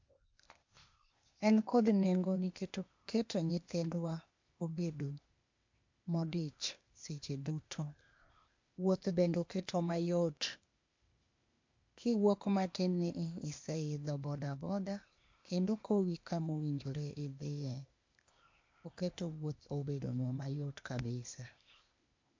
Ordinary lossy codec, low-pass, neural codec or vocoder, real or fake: MP3, 48 kbps; 7.2 kHz; codec, 16 kHz, 0.8 kbps, ZipCodec; fake